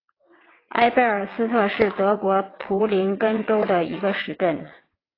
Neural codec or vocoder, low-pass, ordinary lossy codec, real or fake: vocoder, 22.05 kHz, 80 mel bands, WaveNeXt; 5.4 kHz; AAC, 24 kbps; fake